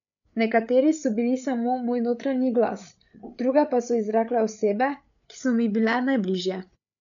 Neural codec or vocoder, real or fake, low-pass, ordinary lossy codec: codec, 16 kHz, 8 kbps, FreqCodec, larger model; fake; 7.2 kHz; none